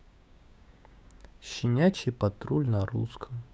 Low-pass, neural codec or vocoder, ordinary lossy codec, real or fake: none; codec, 16 kHz, 6 kbps, DAC; none; fake